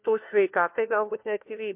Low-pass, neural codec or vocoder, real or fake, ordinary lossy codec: 3.6 kHz; codec, 16 kHz, 1 kbps, FunCodec, trained on LibriTTS, 50 frames a second; fake; MP3, 32 kbps